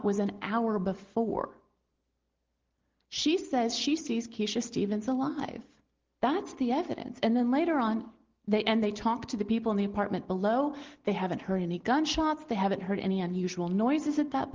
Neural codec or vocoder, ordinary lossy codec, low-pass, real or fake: none; Opus, 16 kbps; 7.2 kHz; real